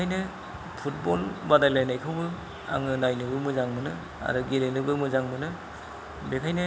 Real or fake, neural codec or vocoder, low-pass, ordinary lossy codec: real; none; none; none